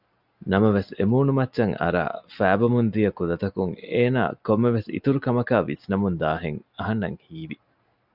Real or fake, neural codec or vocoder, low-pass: real; none; 5.4 kHz